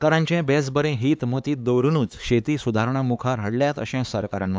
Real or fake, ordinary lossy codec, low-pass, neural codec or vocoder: fake; none; none; codec, 16 kHz, 4 kbps, X-Codec, HuBERT features, trained on LibriSpeech